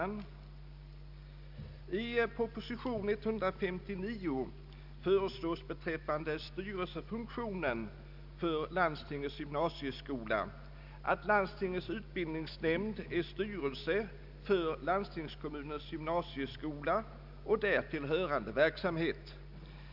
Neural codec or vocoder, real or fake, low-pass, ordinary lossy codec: none; real; 5.4 kHz; none